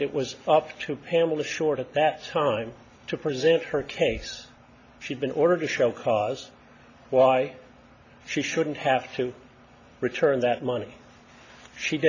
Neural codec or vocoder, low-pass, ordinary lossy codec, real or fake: none; 7.2 kHz; MP3, 48 kbps; real